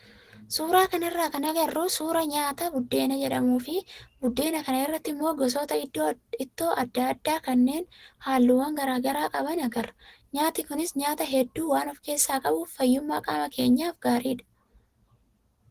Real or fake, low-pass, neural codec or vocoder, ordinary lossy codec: real; 14.4 kHz; none; Opus, 16 kbps